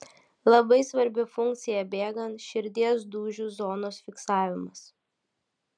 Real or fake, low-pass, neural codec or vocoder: real; 9.9 kHz; none